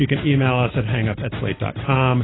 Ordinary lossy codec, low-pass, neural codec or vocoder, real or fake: AAC, 16 kbps; 7.2 kHz; none; real